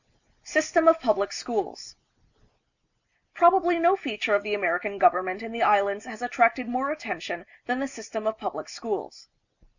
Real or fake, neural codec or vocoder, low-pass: real; none; 7.2 kHz